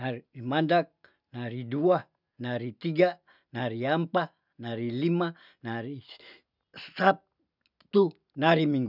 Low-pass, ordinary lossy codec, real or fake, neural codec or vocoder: 5.4 kHz; none; real; none